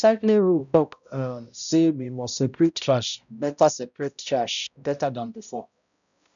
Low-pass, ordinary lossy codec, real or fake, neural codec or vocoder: 7.2 kHz; none; fake; codec, 16 kHz, 0.5 kbps, X-Codec, HuBERT features, trained on balanced general audio